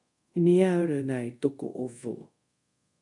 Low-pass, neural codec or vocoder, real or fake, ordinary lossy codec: 10.8 kHz; codec, 24 kHz, 0.5 kbps, DualCodec; fake; MP3, 64 kbps